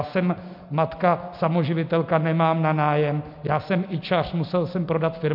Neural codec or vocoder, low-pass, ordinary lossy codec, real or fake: none; 5.4 kHz; MP3, 48 kbps; real